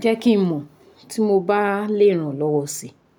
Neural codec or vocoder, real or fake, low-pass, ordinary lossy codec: none; real; 19.8 kHz; none